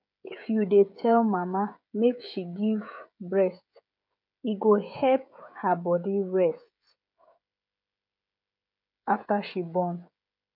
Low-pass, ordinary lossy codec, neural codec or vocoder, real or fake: 5.4 kHz; none; codec, 16 kHz, 16 kbps, FreqCodec, smaller model; fake